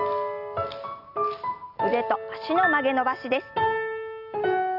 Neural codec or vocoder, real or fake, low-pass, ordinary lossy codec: none; real; 5.4 kHz; none